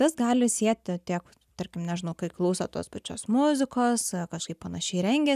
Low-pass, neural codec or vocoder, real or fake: 14.4 kHz; none; real